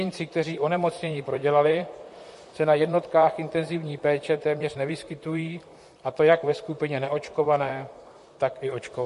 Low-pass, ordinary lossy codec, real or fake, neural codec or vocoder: 14.4 kHz; MP3, 48 kbps; fake; vocoder, 44.1 kHz, 128 mel bands, Pupu-Vocoder